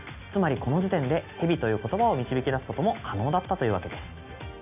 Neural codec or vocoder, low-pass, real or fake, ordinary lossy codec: none; 3.6 kHz; real; none